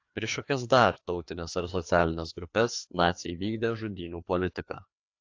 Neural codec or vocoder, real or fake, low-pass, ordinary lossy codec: codec, 16 kHz, 2 kbps, FreqCodec, larger model; fake; 7.2 kHz; AAC, 48 kbps